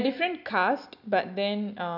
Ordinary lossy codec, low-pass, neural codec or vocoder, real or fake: none; 5.4 kHz; none; real